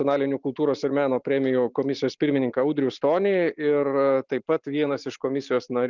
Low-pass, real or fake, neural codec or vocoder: 7.2 kHz; real; none